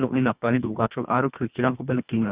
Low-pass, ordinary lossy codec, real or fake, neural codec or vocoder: 3.6 kHz; Opus, 64 kbps; fake; codec, 24 kHz, 1.5 kbps, HILCodec